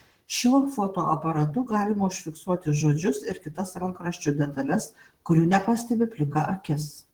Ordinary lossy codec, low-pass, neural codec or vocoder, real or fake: Opus, 16 kbps; 19.8 kHz; vocoder, 44.1 kHz, 128 mel bands, Pupu-Vocoder; fake